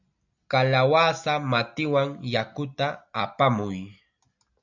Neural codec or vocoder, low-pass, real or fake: none; 7.2 kHz; real